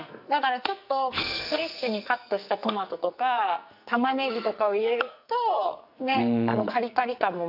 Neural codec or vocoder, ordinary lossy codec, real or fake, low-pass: codec, 44.1 kHz, 2.6 kbps, SNAC; none; fake; 5.4 kHz